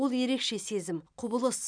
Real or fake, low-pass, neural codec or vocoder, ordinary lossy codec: real; 9.9 kHz; none; none